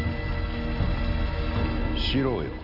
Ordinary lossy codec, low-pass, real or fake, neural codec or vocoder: none; 5.4 kHz; real; none